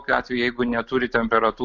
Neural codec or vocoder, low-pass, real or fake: none; 7.2 kHz; real